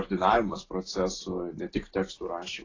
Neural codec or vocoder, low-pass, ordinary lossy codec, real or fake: none; 7.2 kHz; AAC, 32 kbps; real